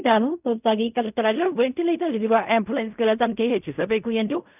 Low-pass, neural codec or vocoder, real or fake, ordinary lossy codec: 3.6 kHz; codec, 16 kHz in and 24 kHz out, 0.4 kbps, LongCat-Audio-Codec, fine tuned four codebook decoder; fake; none